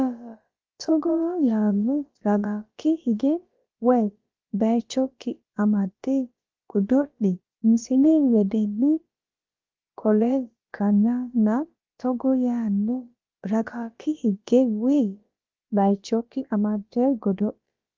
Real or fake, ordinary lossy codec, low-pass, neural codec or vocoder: fake; Opus, 24 kbps; 7.2 kHz; codec, 16 kHz, about 1 kbps, DyCAST, with the encoder's durations